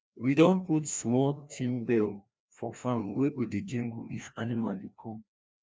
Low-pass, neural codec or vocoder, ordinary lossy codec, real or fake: none; codec, 16 kHz, 1 kbps, FreqCodec, larger model; none; fake